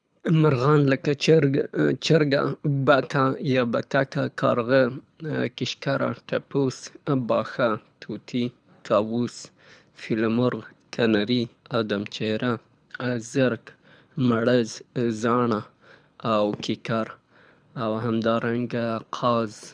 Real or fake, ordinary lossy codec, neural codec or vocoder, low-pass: fake; none; codec, 24 kHz, 6 kbps, HILCodec; 9.9 kHz